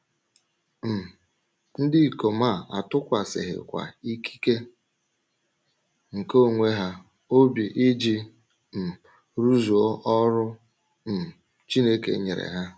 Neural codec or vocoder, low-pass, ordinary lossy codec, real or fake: none; none; none; real